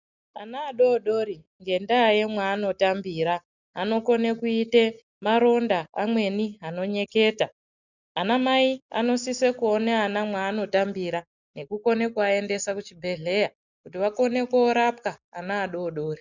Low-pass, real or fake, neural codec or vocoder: 7.2 kHz; real; none